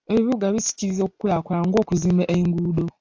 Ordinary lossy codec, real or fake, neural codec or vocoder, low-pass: AAC, 48 kbps; real; none; 7.2 kHz